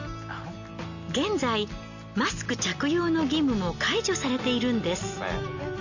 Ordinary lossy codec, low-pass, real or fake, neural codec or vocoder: none; 7.2 kHz; real; none